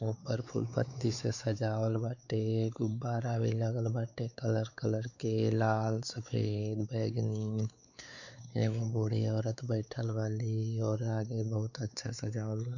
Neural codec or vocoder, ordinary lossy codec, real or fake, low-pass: codec, 16 kHz, 4 kbps, X-Codec, WavLM features, trained on Multilingual LibriSpeech; none; fake; 7.2 kHz